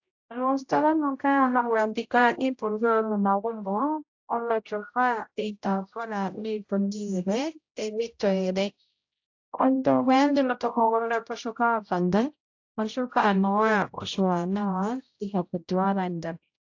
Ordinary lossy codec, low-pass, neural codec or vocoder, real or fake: AAC, 48 kbps; 7.2 kHz; codec, 16 kHz, 0.5 kbps, X-Codec, HuBERT features, trained on general audio; fake